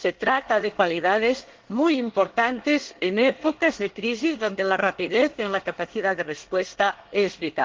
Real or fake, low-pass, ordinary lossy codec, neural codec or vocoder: fake; 7.2 kHz; Opus, 16 kbps; codec, 24 kHz, 1 kbps, SNAC